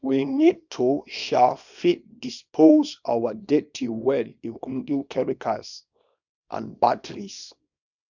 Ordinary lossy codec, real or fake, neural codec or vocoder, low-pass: none; fake; codec, 24 kHz, 0.9 kbps, WavTokenizer, small release; 7.2 kHz